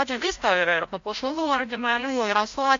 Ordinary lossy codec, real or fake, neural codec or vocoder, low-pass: MP3, 48 kbps; fake; codec, 16 kHz, 0.5 kbps, FreqCodec, larger model; 7.2 kHz